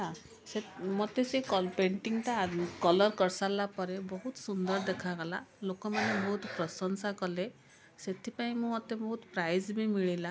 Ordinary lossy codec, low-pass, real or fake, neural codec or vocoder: none; none; real; none